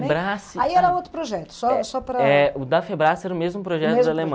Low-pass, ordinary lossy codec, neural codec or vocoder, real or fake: none; none; none; real